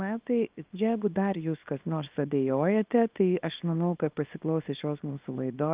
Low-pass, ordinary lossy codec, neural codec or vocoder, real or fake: 3.6 kHz; Opus, 32 kbps; codec, 24 kHz, 0.9 kbps, WavTokenizer, small release; fake